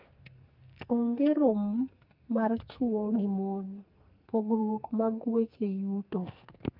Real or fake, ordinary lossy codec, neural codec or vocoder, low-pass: fake; Opus, 24 kbps; codec, 44.1 kHz, 2.6 kbps, SNAC; 5.4 kHz